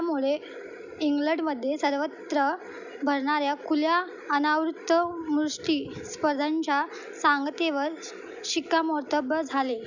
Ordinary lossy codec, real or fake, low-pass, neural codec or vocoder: none; real; 7.2 kHz; none